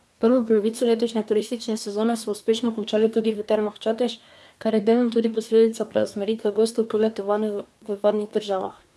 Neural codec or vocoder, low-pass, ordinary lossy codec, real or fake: codec, 24 kHz, 1 kbps, SNAC; none; none; fake